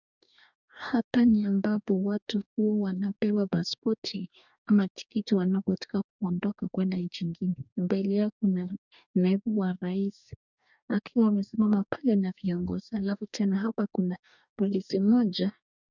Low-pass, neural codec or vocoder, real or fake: 7.2 kHz; codec, 44.1 kHz, 2.6 kbps, DAC; fake